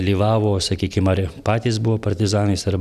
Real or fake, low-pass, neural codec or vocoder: real; 14.4 kHz; none